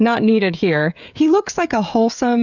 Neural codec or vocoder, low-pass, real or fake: codec, 16 kHz, 16 kbps, FreqCodec, smaller model; 7.2 kHz; fake